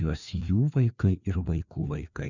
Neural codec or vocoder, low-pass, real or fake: codec, 16 kHz, 2 kbps, FreqCodec, larger model; 7.2 kHz; fake